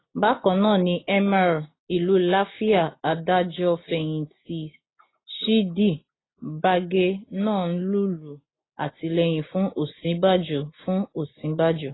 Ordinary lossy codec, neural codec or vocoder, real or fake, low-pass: AAC, 16 kbps; none; real; 7.2 kHz